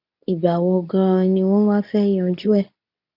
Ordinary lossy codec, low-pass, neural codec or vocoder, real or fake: none; 5.4 kHz; codec, 24 kHz, 0.9 kbps, WavTokenizer, medium speech release version 2; fake